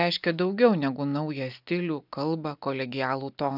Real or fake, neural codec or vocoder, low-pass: real; none; 5.4 kHz